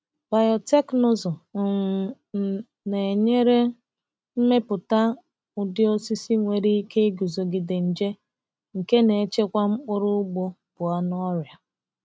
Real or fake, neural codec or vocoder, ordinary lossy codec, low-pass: real; none; none; none